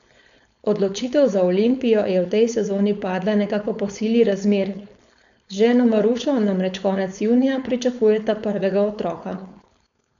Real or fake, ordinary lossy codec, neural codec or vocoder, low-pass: fake; none; codec, 16 kHz, 4.8 kbps, FACodec; 7.2 kHz